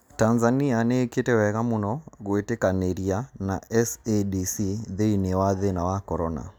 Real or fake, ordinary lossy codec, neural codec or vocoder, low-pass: real; none; none; none